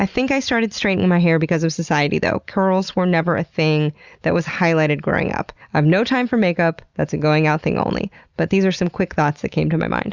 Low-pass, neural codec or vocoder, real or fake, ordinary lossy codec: 7.2 kHz; none; real; Opus, 64 kbps